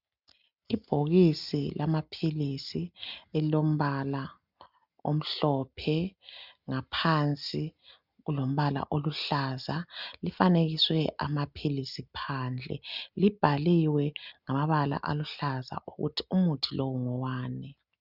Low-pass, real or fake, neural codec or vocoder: 5.4 kHz; real; none